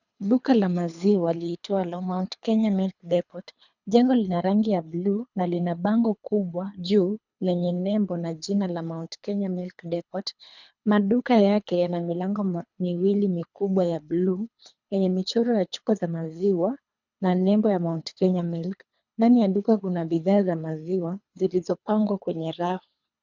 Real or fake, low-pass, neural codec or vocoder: fake; 7.2 kHz; codec, 24 kHz, 3 kbps, HILCodec